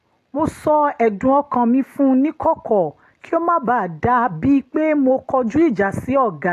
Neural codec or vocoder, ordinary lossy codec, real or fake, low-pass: none; AAC, 48 kbps; real; 14.4 kHz